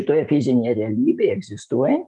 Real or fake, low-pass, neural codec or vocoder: real; 10.8 kHz; none